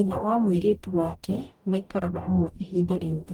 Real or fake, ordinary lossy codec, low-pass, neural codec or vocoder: fake; Opus, 32 kbps; 19.8 kHz; codec, 44.1 kHz, 0.9 kbps, DAC